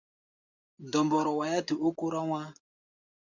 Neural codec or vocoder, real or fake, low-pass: none; real; 7.2 kHz